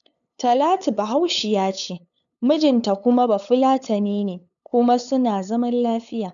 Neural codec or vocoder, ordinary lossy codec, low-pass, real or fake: codec, 16 kHz, 8 kbps, FunCodec, trained on LibriTTS, 25 frames a second; none; 7.2 kHz; fake